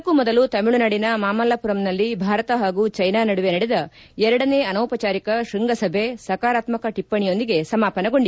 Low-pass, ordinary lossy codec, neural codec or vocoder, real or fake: none; none; none; real